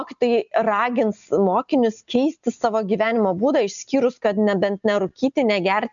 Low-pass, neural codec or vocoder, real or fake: 7.2 kHz; none; real